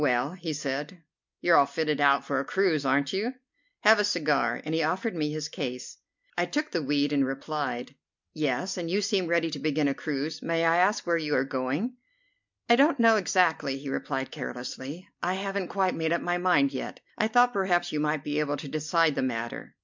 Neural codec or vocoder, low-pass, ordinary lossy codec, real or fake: none; 7.2 kHz; MP3, 64 kbps; real